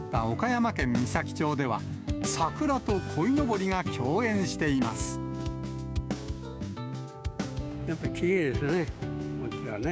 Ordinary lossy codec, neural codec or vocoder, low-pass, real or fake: none; codec, 16 kHz, 6 kbps, DAC; none; fake